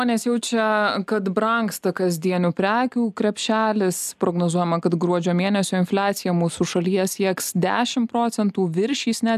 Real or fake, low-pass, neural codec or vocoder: real; 14.4 kHz; none